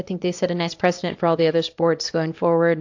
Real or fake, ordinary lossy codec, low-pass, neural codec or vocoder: fake; AAC, 48 kbps; 7.2 kHz; codec, 24 kHz, 0.9 kbps, WavTokenizer, small release